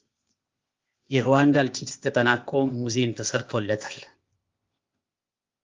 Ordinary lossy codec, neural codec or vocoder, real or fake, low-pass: Opus, 32 kbps; codec, 16 kHz, 0.8 kbps, ZipCodec; fake; 7.2 kHz